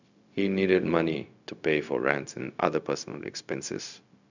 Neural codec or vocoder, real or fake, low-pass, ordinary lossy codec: codec, 16 kHz, 0.4 kbps, LongCat-Audio-Codec; fake; 7.2 kHz; none